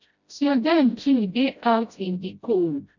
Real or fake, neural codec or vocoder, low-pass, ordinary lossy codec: fake; codec, 16 kHz, 0.5 kbps, FreqCodec, smaller model; 7.2 kHz; none